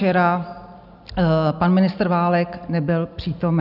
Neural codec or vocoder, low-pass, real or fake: none; 5.4 kHz; real